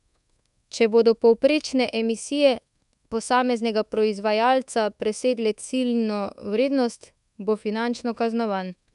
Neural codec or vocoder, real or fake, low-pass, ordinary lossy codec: codec, 24 kHz, 1.2 kbps, DualCodec; fake; 10.8 kHz; none